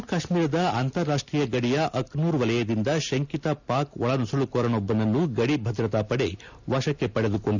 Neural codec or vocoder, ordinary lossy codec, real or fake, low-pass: none; none; real; 7.2 kHz